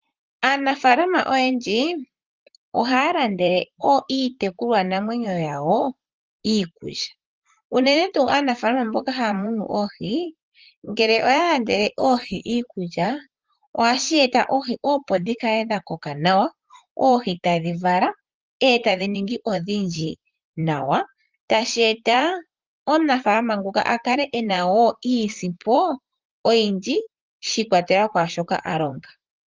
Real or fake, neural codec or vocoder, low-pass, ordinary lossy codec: fake; vocoder, 44.1 kHz, 128 mel bands, Pupu-Vocoder; 7.2 kHz; Opus, 24 kbps